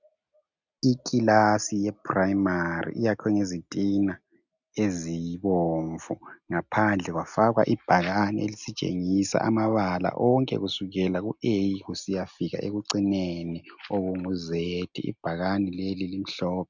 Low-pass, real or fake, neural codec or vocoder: 7.2 kHz; real; none